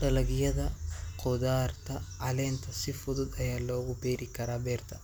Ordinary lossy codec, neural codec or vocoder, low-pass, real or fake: none; none; none; real